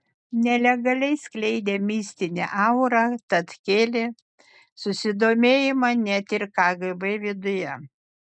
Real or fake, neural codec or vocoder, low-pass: real; none; 9.9 kHz